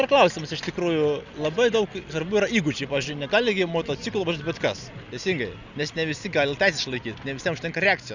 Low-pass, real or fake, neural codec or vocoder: 7.2 kHz; real; none